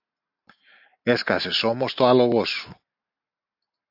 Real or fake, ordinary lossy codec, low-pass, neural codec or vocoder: real; AAC, 48 kbps; 5.4 kHz; none